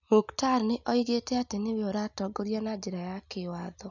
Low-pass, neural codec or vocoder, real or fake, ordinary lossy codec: 7.2 kHz; none; real; none